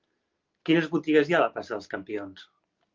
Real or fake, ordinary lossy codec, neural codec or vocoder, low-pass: real; Opus, 32 kbps; none; 7.2 kHz